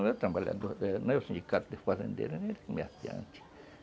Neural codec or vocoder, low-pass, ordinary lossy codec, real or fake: none; none; none; real